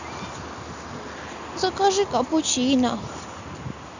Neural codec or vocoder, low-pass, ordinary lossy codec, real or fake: none; 7.2 kHz; none; real